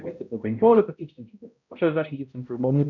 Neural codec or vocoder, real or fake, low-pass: codec, 16 kHz, 0.5 kbps, X-Codec, HuBERT features, trained on balanced general audio; fake; 7.2 kHz